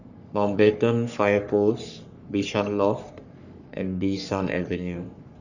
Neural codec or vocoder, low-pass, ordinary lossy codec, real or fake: codec, 44.1 kHz, 3.4 kbps, Pupu-Codec; 7.2 kHz; Opus, 64 kbps; fake